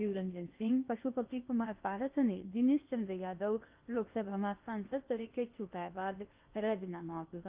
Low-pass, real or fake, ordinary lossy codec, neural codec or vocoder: 3.6 kHz; fake; Opus, 24 kbps; codec, 16 kHz in and 24 kHz out, 0.8 kbps, FocalCodec, streaming, 65536 codes